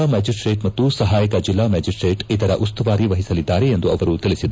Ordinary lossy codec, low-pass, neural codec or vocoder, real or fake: none; none; none; real